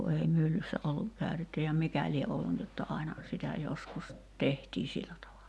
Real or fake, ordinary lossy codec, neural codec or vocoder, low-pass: real; none; none; none